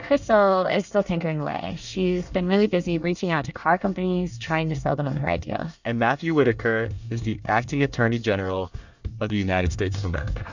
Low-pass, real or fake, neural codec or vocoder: 7.2 kHz; fake; codec, 24 kHz, 1 kbps, SNAC